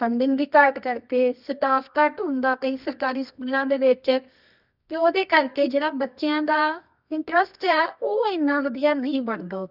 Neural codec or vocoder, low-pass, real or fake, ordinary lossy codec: codec, 24 kHz, 0.9 kbps, WavTokenizer, medium music audio release; 5.4 kHz; fake; none